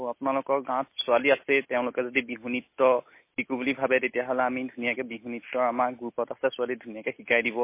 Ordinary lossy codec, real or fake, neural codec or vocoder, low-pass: MP3, 24 kbps; real; none; 3.6 kHz